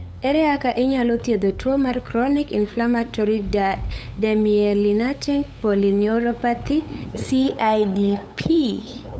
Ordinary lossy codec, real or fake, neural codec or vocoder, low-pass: none; fake; codec, 16 kHz, 8 kbps, FunCodec, trained on LibriTTS, 25 frames a second; none